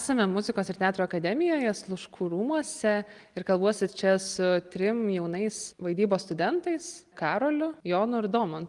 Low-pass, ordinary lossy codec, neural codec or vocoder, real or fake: 10.8 kHz; Opus, 24 kbps; none; real